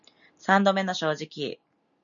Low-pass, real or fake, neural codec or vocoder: 7.2 kHz; real; none